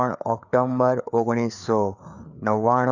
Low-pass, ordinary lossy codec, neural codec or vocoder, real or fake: 7.2 kHz; none; codec, 16 kHz, 4 kbps, FreqCodec, larger model; fake